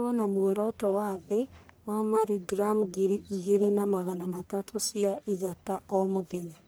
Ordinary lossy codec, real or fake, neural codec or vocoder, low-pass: none; fake; codec, 44.1 kHz, 1.7 kbps, Pupu-Codec; none